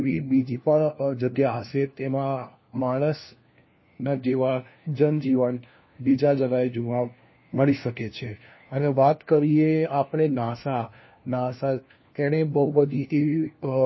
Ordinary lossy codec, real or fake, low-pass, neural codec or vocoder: MP3, 24 kbps; fake; 7.2 kHz; codec, 16 kHz, 1 kbps, FunCodec, trained on LibriTTS, 50 frames a second